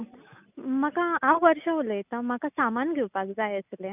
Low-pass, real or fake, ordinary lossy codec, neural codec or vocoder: 3.6 kHz; real; none; none